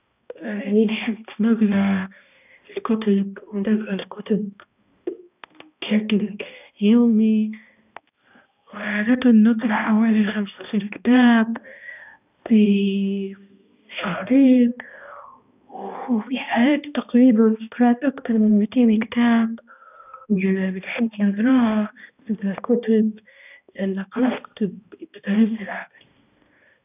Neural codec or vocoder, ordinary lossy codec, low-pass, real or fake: codec, 16 kHz, 1 kbps, X-Codec, HuBERT features, trained on balanced general audio; none; 3.6 kHz; fake